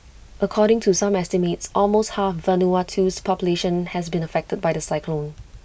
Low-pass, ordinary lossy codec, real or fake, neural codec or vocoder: none; none; real; none